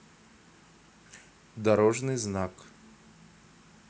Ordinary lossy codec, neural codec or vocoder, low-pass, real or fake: none; none; none; real